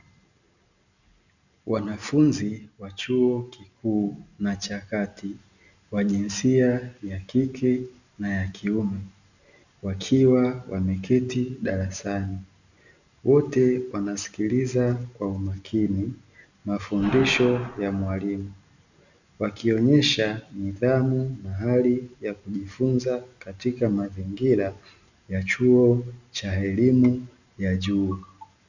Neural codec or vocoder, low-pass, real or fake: none; 7.2 kHz; real